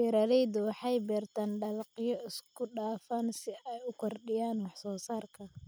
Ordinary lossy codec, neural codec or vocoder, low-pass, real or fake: none; none; none; real